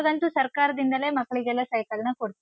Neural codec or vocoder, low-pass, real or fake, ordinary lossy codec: none; none; real; none